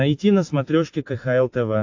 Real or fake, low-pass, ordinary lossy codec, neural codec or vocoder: real; 7.2 kHz; AAC, 48 kbps; none